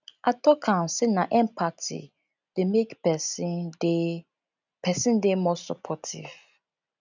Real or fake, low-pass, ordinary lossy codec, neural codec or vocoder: real; 7.2 kHz; none; none